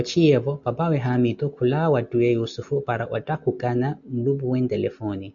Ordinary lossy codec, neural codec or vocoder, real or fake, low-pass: MP3, 96 kbps; none; real; 7.2 kHz